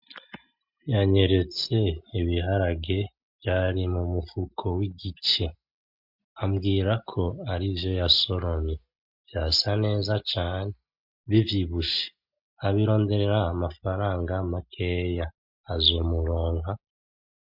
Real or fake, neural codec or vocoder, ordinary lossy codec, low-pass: real; none; MP3, 48 kbps; 5.4 kHz